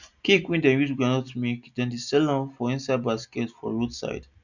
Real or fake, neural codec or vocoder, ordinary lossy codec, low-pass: real; none; none; 7.2 kHz